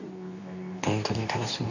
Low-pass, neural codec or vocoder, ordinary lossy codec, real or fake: 7.2 kHz; codec, 24 kHz, 0.9 kbps, WavTokenizer, medium speech release version 2; none; fake